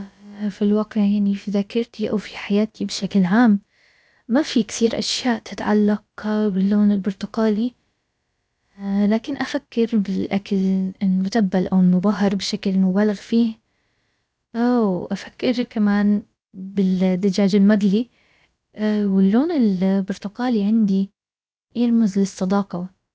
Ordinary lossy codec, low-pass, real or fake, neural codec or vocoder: none; none; fake; codec, 16 kHz, about 1 kbps, DyCAST, with the encoder's durations